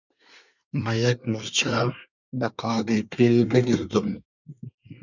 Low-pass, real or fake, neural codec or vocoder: 7.2 kHz; fake; codec, 24 kHz, 1 kbps, SNAC